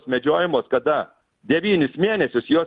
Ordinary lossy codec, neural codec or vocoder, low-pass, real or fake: Opus, 32 kbps; none; 10.8 kHz; real